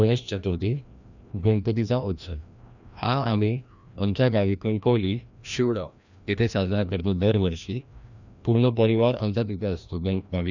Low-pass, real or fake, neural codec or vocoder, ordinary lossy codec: 7.2 kHz; fake; codec, 16 kHz, 1 kbps, FreqCodec, larger model; none